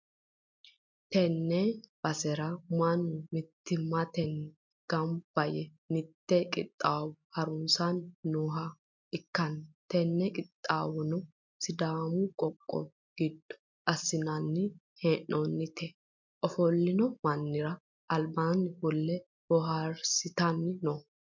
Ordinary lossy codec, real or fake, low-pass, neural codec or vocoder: MP3, 64 kbps; real; 7.2 kHz; none